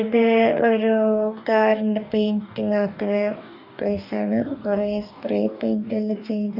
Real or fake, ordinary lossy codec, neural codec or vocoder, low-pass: fake; none; codec, 44.1 kHz, 2.6 kbps, DAC; 5.4 kHz